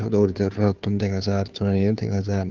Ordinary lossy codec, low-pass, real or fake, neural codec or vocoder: Opus, 32 kbps; 7.2 kHz; fake; codec, 16 kHz, 2 kbps, FunCodec, trained on Chinese and English, 25 frames a second